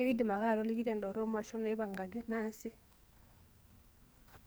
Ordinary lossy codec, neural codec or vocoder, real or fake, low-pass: none; codec, 44.1 kHz, 2.6 kbps, SNAC; fake; none